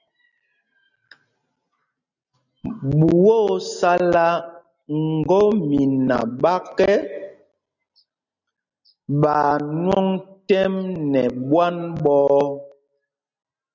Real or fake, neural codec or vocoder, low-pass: real; none; 7.2 kHz